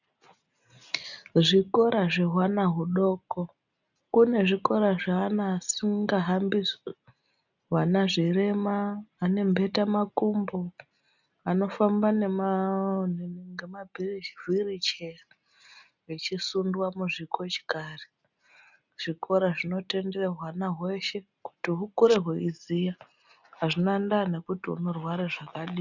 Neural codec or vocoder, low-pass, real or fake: none; 7.2 kHz; real